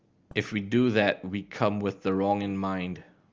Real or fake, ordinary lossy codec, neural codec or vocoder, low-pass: real; Opus, 24 kbps; none; 7.2 kHz